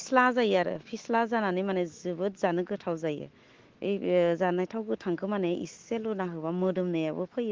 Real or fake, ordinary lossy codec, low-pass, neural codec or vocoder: fake; Opus, 16 kbps; 7.2 kHz; autoencoder, 48 kHz, 128 numbers a frame, DAC-VAE, trained on Japanese speech